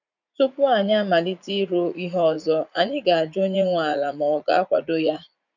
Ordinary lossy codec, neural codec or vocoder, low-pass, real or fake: none; vocoder, 44.1 kHz, 80 mel bands, Vocos; 7.2 kHz; fake